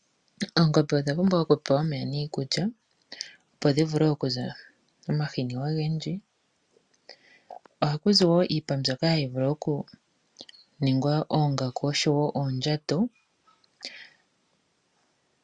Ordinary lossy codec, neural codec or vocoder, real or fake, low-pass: Opus, 64 kbps; none; real; 9.9 kHz